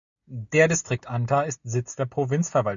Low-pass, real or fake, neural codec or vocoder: 7.2 kHz; real; none